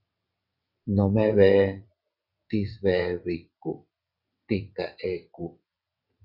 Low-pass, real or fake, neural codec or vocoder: 5.4 kHz; fake; vocoder, 22.05 kHz, 80 mel bands, WaveNeXt